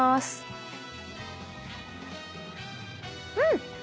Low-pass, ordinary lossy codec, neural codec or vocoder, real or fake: none; none; none; real